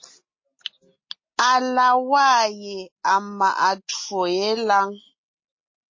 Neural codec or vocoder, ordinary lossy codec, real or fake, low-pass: none; MP3, 32 kbps; real; 7.2 kHz